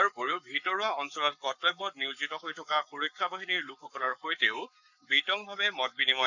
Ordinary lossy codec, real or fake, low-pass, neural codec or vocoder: none; fake; 7.2 kHz; codec, 44.1 kHz, 7.8 kbps, Pupu-Codec